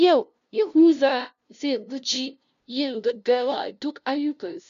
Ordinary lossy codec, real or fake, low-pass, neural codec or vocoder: MP3, 64 kbps; fake; 7.2 kHz; codec, 16 kHz, 0.5 kbps, FunCodec, trained on LibriTTS, 25 frames a second